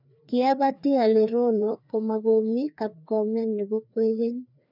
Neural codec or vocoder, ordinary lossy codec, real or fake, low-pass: codec, 16 kHz, 2 kbps, FreqCodec, larger model; none; fake; 5.4 kHz